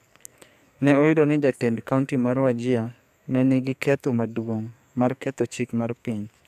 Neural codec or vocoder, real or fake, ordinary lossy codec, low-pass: codec, 44.1 kHz, 2.6 kbps, SNAC; fake; none; 14.4 kHz